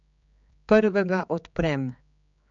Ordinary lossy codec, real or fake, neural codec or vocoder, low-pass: MP3, 64 kbps; fake; codec, 16 kHz, 4 kbps, X-Codec, HuBERT features, trained on general audio; 7.2 kHz